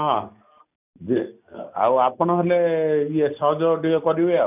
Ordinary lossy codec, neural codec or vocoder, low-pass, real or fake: none; none; 3.6 kHz; real